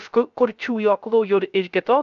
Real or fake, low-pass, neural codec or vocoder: fake; 7.2 kHz; codec, 16 kHz, 0.3 kbps, FocalCodec